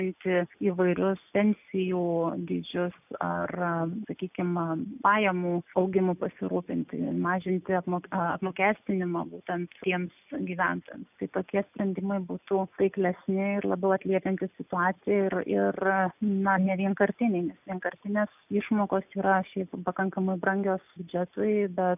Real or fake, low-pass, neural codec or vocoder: real; 3.6 kHz; none